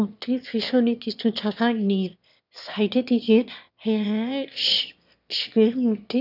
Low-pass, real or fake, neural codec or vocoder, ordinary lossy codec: 5.4 kHz; fake; autoencoder, 22.05 kHz, a latent of 192 numbers a frame, VITS, trained on one speaker; none